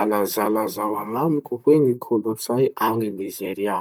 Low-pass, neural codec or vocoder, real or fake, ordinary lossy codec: none; vocoder, 44.1 kHz, 128 mel bands, Pupu-Vocoder; fake; none